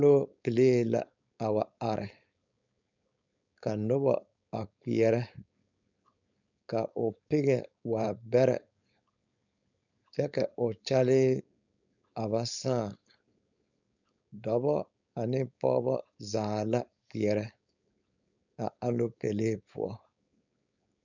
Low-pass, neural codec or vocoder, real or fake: 7.2 kHz; codec, 16 kHz, 4.8 kbps, FACodec; fake